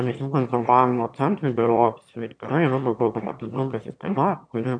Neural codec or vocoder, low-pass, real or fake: autoencoder, 22.05 kHz, a latent of 192 numbers a frame, VITS, trained on one speaker; 9.9 kHz; fake